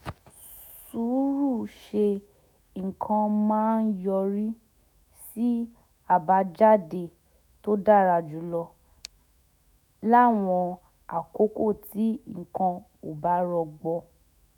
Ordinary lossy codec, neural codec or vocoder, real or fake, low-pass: none; autoencoder, 48 kHz, 128 numbers a frame, DAC-VAE, trained on Japanese speech; fake; 19.8 kHz